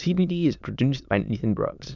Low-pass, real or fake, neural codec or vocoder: 7.2 kHz; fake; autoencoder, 22.05 kHz, a latent of 192 numbers a frame, VITS, trained on many speakers